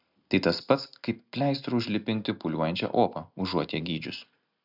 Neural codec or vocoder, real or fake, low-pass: none; real; 5.4 kHz